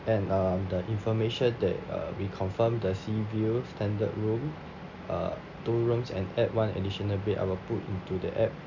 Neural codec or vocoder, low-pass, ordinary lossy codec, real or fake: none; 7.2 kHz; none; real